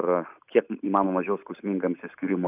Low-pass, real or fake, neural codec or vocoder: 3.6 kHz; real; none